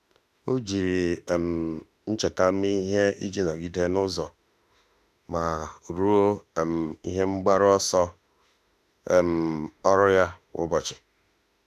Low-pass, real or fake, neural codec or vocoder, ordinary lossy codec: 14.4 kHz; fake; autoencoder, 48 kHz, 32 numbers a frame, DAC-VAE, trained on Japanese speech; none